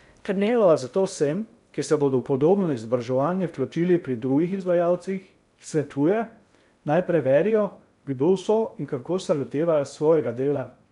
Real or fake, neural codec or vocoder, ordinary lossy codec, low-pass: fake; codec, 16 kHz in and 24 kHz out, 0.6 kbps, FocalCodec, streaming, 2048 codes; none; 10.8 kHz